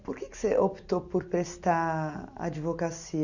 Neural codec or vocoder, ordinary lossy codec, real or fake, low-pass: none; none; real; 7.2 kHz